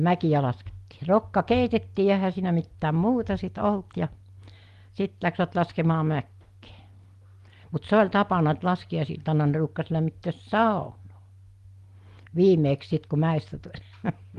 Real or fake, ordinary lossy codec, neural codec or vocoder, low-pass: real; Opus, 24 kbps; none; 14.4 kHz